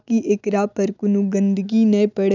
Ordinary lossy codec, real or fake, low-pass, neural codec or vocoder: none; real; 7.2 kHz; none